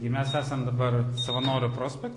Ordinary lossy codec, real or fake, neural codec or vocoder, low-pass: AAC, 48 kbps; real; none; 10.8 kHz